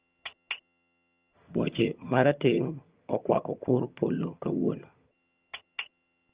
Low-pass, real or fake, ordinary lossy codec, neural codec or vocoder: 3.6 kHz; fake; Opus, 24 kbps; vocoder, 22.05 kHz, 80 mel bands, HiFi-GAN